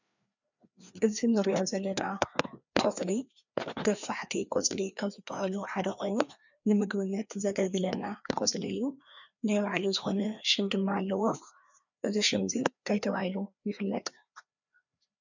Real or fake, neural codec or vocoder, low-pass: fake; codec, 16 kHz, 2 kbps, FreqCodec, larger model; 7.2 kHz